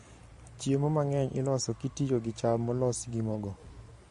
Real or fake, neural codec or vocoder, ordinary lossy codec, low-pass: fake; vocoder, 44.1 kHz, 128 mel bands every 512 samples, BigVGAN v2; MP3, 48 kbps; 14.4 kHz